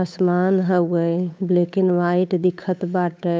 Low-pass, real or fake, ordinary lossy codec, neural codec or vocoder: none; fake; none; codec, 16 kHz, 8 kbps, FunCodec, trained on Chinese and English, 25 frames a second